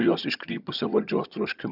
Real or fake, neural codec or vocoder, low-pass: fake; vocoder, 22.05 kHz, 80 mel bands, HiFi-GAN; 5.4 kHz